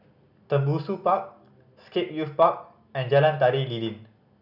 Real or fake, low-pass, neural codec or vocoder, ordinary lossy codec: real; 5.4 kHz; none; none